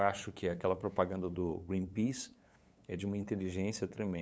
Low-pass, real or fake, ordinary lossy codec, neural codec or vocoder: none; fake; none; codec, 16 kHz, 8 kbps, FunCodec, trained on LibriTTS, 25 frames a second